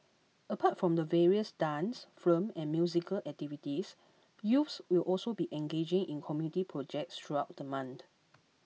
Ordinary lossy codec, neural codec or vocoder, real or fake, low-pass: none; none; real; none